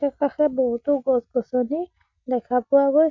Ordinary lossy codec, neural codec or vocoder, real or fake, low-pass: MP3, 64 kbps; none; real; 7.2 kHz